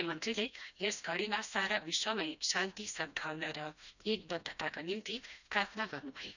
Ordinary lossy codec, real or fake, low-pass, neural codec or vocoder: none; fake; 7.2 kHz; codec, 16 kHz, 1 kbps, FreqCodec, smaller model